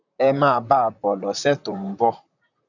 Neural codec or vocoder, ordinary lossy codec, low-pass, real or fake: vocoder, 44.1 kHz, 128 mel bands, Pupu-Vocoder; none; 7.2 kHz; fake